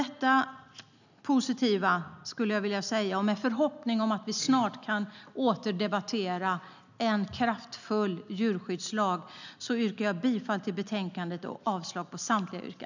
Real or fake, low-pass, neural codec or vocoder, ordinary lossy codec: real; 7.2 kHz; none; none